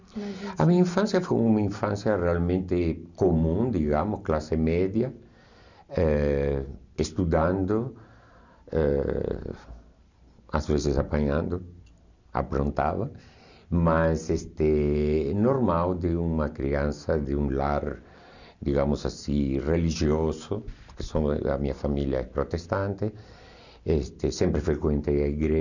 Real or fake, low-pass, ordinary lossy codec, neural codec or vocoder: real; 7.2 kHz; none; none